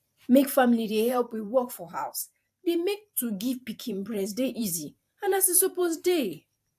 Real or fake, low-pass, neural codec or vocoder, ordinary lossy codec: fake; 14.4 kHz; vocoder, 44.1 kHz, 128 mel bands every 512 samples, BigVGAN v2; AAC, 96 kbps